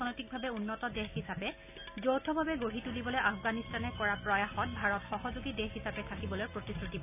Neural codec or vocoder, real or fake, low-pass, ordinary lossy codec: none; real; 3.6 kHz; none